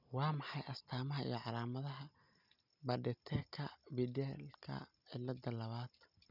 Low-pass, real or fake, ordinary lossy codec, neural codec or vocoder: 5.4 kHz; real; none; none